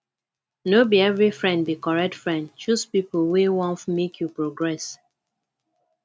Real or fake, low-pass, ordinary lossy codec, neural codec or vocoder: real; none; none; none